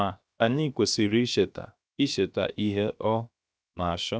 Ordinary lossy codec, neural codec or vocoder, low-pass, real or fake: none; codec, 16 kHz, 0.7 kbps, FocalCodec; none; fake